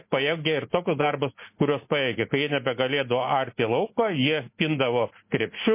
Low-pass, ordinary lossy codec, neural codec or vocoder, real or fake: 3.6 kHz; MP3, 24 kbps; none; real